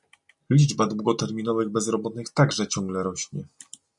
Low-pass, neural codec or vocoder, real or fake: 10.8 kHz; none; real